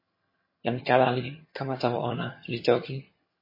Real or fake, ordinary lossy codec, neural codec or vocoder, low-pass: fake; MP3, 24 kbps; vocoder, 22.05 kHz, 80 mel bands, HiFi-GAN; 5.4 kHz